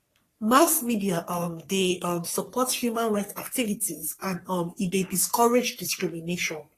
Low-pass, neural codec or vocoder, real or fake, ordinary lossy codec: 14.4 kHz; codec, 44.1 kHz, 3.4 kbps, Pupu-Codec; fake; AAC, 48 kbps